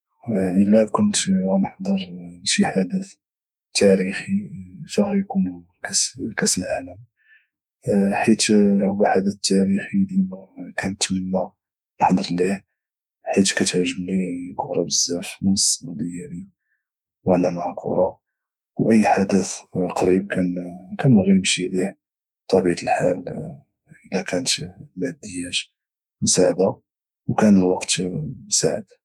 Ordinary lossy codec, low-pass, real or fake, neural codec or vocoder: none; 19.8 kHz; fake; autoencoder, 48 kHz, 32 numbers a frame, DAC-VAE, trained on Japanese speech